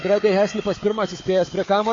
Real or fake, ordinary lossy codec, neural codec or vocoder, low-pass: fake; AAC, 32 kbps; codec, 16 kHz, 4 kbps, FunCodec, trained on Chinese and English, 50 frames a second; 7.2 kHz